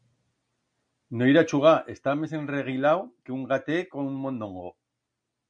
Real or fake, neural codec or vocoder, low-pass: real; none; 9.9 kHz